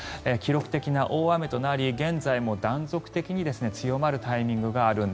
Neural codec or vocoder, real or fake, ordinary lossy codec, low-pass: none; real; none; none